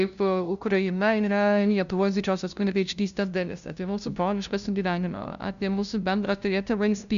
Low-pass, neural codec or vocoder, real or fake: 7.2 kHz; codec, 16 kHz, 0.5 kbps, FunCodec, trained on LibriTTS, 25 frames a second; fake